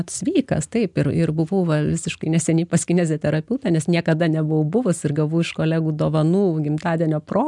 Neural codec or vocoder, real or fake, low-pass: none; real; 10.8 kHz